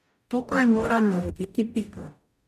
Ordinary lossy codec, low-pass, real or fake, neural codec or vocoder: none; 14.4 kHz; fake; codec, 44.1 kHz, 0.9 kbps, DAC